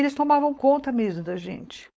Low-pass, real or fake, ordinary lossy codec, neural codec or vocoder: none; fake; none; codec, 16 kHz, 4.8 kbps, FACodec